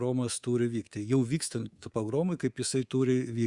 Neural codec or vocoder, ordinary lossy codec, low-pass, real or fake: codec, 24 kHz, 3.1 kbps, DualCodec; Opus, 64 kbps; 10.8 kHz; fake